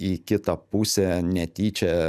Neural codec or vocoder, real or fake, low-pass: none; real; 14.4 kHz